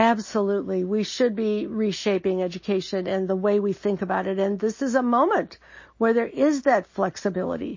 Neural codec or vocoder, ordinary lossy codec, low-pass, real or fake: none; MP3, 32 kbps; 7.2 kHz; real